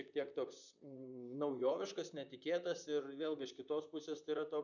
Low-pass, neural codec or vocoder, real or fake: 7.2 kHz; vocoder, 44.1 kHz, 128 mel bands, Pupu-Vocoder; fake